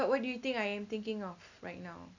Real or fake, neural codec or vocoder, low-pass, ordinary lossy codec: real; none; 7.2 kHz; none